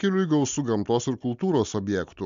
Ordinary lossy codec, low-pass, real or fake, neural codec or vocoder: MP3, 64 kbps; 7.2 kHz; real; none